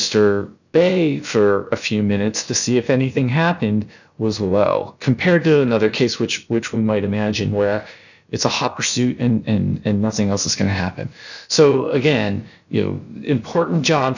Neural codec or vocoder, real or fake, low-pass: codec, 16 kHz, about 1 kbps, DyCAST, with the encoder's durations; fake; 7.2 kHz